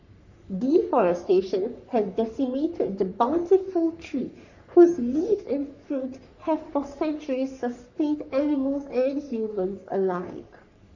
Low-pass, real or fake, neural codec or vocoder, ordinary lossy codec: 7.2 kHz; fake; codec, 44.1 kHz, 3.4 kbps, Pupu-Codec; none